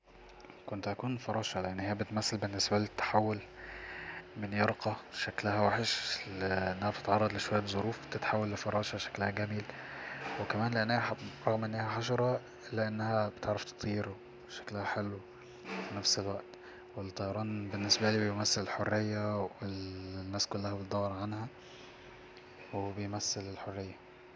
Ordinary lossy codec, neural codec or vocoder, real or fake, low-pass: none; none; real; none